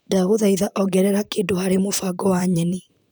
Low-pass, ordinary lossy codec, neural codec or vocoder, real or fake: none; none; vocoder, 44.1 kHz, 128 mel bands, Pupu-Vocoder; fake